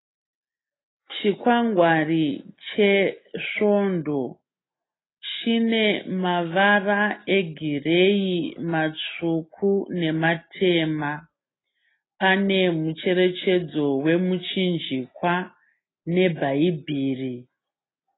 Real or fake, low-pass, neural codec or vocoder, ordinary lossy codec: real; 7.2 kHz; none; AAC, 16 kbps